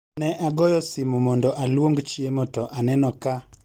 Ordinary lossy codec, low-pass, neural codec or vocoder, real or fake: Opus, 24 kbps; 19.8 kHz; none; real